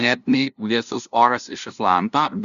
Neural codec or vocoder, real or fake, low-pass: codec, 16 kHz, 0.5 kbps, FunCodec, trained on LibriTTS, 25 frames a second; fake; 7.2 kHz